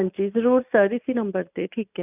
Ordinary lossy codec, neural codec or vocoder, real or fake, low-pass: none; none; real; 3.6 kHz